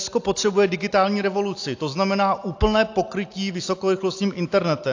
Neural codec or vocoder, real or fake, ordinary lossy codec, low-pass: none; real; AAC, 48 kbps; 7.2 kHz